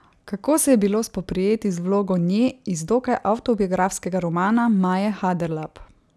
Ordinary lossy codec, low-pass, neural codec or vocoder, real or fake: none; none; none; real